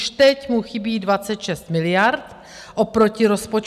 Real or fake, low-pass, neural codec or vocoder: real; 14.4 kHz; none